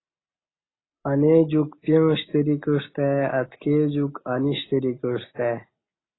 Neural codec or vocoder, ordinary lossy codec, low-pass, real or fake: none; AAC, 16 kbps; 7.2 kHz; real